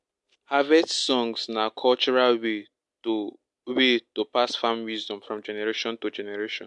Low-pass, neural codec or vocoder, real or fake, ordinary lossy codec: 10.8 kHz; none; real; MP3, 64 kbps